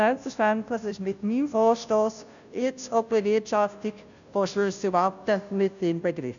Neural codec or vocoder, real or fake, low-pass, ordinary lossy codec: codec, 16 kHz, 0.5 kbps, FunCodec, trained on Chinese and English, 25 frames a second; fake; 7.2 kHz; none